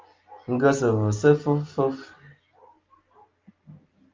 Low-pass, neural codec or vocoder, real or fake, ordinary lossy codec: 7.2 kHz; none; real; Opus, 32 kbps